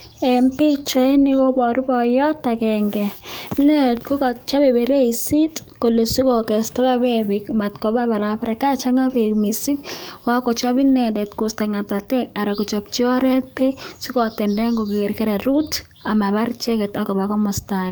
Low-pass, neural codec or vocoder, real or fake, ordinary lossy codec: none; codec, 44.1 kHz, 7.8 kbps, DAC; fake; none